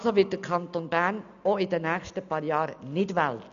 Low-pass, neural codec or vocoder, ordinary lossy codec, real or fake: 7.2 kHz; none; none; real